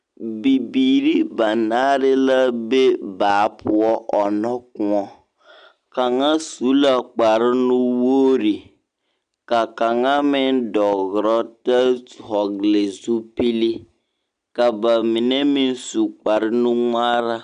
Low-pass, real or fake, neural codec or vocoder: 9.9 kHz; real; none